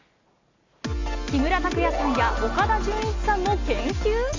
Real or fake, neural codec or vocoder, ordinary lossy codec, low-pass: real; none; AAC, 48 kbps; 7.2 kHz